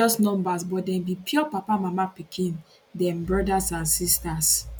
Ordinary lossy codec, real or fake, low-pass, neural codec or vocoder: none; real; none; none